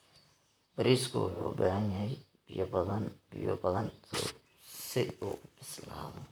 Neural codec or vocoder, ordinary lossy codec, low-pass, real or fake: vocoder, 44.1 kHz, 128 mel bands, Pupu-Vocoder; none; none; fake